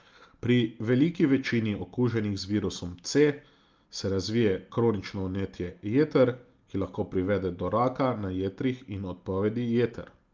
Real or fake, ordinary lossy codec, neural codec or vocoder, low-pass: real; Opus, 24 kbps; none; 7.2 kHz